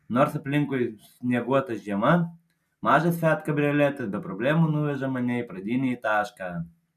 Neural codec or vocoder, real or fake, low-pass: none; real; 14.4 kHz